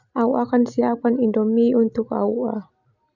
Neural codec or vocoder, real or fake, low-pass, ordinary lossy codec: none; real; 7.2 kHz; none